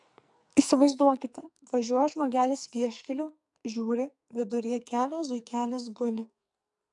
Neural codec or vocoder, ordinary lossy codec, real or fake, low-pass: codec, 44.1 kHz, 2.6 kbps, SNAC; MP3, 96 kbps; fake; 10.8 kHz